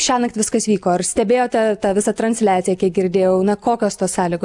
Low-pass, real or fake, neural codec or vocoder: 10.8 kHz; real; none